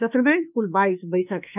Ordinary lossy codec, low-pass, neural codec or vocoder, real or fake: none; 3.6 kHz; autoencoder, 48 kHz, 32 numbers a frame, DAC-VAE, trained on Japanese speech; fake